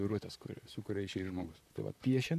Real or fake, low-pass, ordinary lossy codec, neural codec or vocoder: fake; 14.4 kHz; MP3, 96 kbps; vocoder, 44.1 kHz, 128 mel bands, Pupu-Vocoder